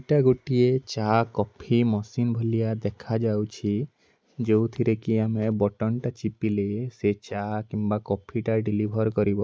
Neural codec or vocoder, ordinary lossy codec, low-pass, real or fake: none; none; none; real